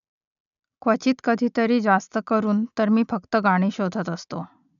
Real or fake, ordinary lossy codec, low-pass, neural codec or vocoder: real; none; 7.2 kHz; none